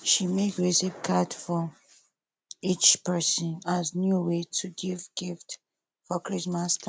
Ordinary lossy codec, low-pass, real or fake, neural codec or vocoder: none; none; real; none